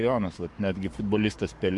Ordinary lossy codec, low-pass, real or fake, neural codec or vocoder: MP3, 64 kbps; 10.8 kHz; fake; codec, 44.1 kHz, 7.8 kbps, Pupu-Codec